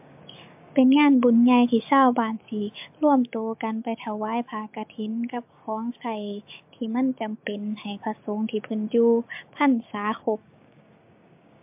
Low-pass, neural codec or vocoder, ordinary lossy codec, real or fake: 3.6 kHz; codec, 16 kHz, 6 kbps, DAC; MP3, 32 kbps; fake